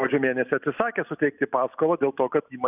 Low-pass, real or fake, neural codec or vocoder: 3.6 kHz; real; none